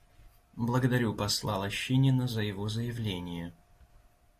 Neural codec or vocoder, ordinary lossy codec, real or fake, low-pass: none; AAC, 64 kbps; real; 14.4 kHz